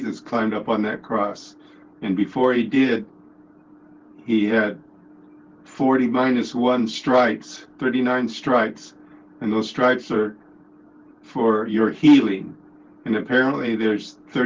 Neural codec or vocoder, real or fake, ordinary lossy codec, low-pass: none; real; Opus, 16 kbps; 7.2 kHz